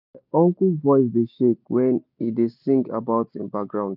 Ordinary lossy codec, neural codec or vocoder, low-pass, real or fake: none; none; 5.4 kHz; real